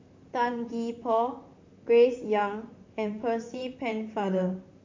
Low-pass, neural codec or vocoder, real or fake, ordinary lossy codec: 7.2 kHz; vocoder, 44.1 kHz, 128 mel bands, Pupu-Vocoder; fake; MP3, 48 kbps